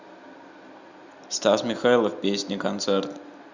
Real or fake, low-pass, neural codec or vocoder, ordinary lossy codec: real; 7.2 kHz; none; Opus, 64 kbps